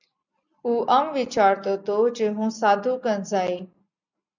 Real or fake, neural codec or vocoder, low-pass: real; none; 7.2 kHz